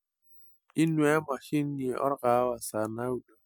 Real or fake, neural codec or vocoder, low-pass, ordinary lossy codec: real; none; none; none